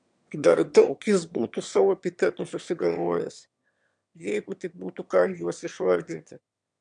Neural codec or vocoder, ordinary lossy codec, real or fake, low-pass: autoencoder, 22.05 kHz, a latent of 192 numbers a frame, VITS, trained on one speaker; MP3, 96 kbps; fake; 9.9 kHz